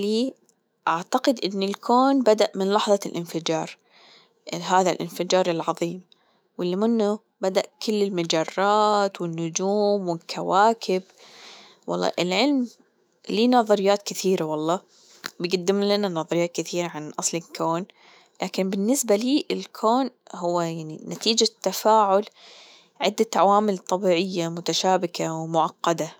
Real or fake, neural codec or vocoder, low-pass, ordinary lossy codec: fake; autoencoder, 48 kHz, 128 numbers a frame, DAC-VAE, trained on Japanese speech; none; none